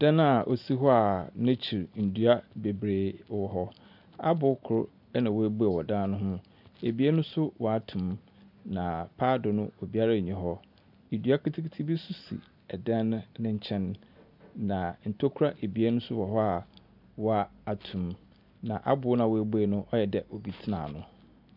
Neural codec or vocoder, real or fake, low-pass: none; real; 5.4 kHz